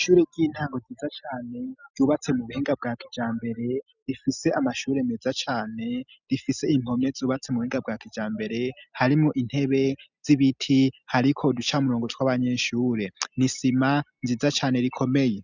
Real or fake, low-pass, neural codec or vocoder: real; 7.2 kHz; none